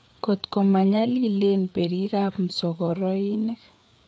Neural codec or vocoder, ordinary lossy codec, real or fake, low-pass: codec, 16 kHz, 4 kbps, FreqCodec, larger model; none; fake; none